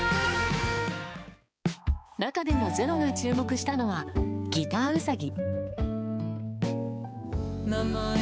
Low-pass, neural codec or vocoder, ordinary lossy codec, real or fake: none; codec, 16 kHz, 4 kbps, X-Codec, HuBERT features, trained on balanced general audio; none; fake